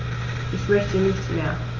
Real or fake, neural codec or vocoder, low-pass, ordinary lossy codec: real; none; 7.2 kHz; Opus, 32 kbps